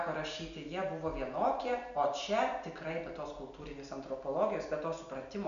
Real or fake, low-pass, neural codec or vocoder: real; 7.2 kHz; none